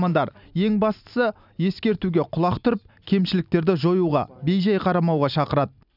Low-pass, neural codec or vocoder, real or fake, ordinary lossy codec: 5.4 kHz; none; real; none